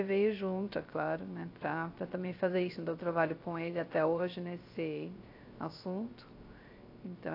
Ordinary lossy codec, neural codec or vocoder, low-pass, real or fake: AAC, 32 kbps; codec, 16 kHz, 0.3 kbps, FocalCodec; 5.4 kHz; fake